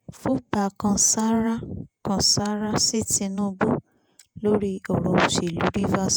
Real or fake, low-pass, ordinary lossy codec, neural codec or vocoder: fake; none; none; vocoder, 48 kHz, 128 mel bands, Vocos